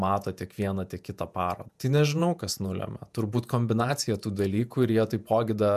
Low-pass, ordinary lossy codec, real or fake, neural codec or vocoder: 14.4 kHz; MP3, 96 kbps; fake; vocoder, 48 kHz, 128 mel bands, Vocos